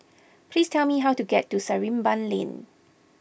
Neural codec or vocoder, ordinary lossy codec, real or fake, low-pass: none; none; real; none